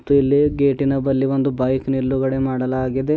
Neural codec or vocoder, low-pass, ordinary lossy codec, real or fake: none; none; none; real